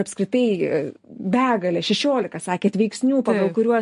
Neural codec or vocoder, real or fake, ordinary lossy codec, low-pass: none; real; MP3, 48 kbps; 14.4 kHz